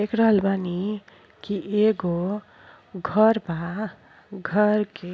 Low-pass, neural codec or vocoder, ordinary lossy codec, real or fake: none; none; none; real